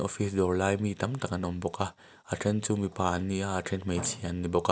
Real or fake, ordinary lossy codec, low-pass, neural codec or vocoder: real; none; none; none